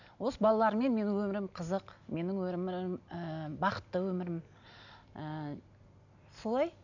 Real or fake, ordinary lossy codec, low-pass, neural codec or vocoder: real; none; 7.2 kHz; none